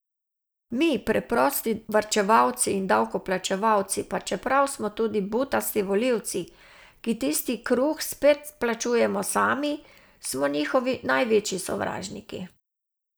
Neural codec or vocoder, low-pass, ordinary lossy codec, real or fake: none; none; none; real